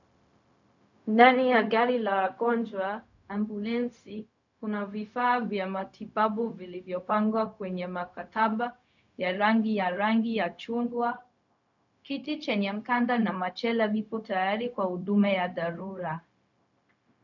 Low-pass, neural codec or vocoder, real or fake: 7.2 kHz; codec, 16 kHz, 0.4 kbps, LongCat-Audio-Codec; fake